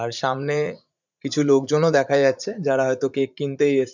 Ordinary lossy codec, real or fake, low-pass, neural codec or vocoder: none; fake; 7.2 kHz; vocoder, 44.1 kHz, 80 mel bands, Vocos